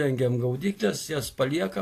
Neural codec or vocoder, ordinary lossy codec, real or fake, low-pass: none; AAC, 64 kbps; real; 14.4 kHz